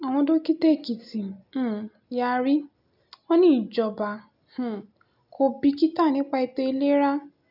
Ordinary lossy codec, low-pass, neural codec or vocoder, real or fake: none; 5.4 kHz; none; real